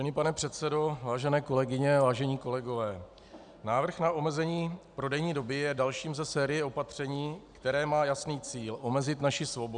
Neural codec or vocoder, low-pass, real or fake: none; 9.9 kHz; real